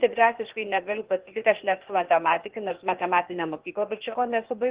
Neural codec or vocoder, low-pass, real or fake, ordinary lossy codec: codec, 16 kHz, 0.8 kbps, ZipCodec; 3.6 kHz; fake; Opus, 16 kbps